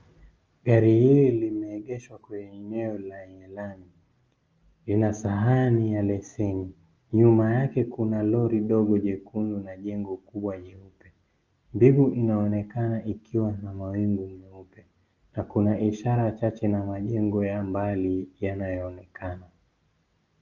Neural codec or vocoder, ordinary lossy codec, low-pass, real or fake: none; Opus, 24 kbps; 7.2 kHz; real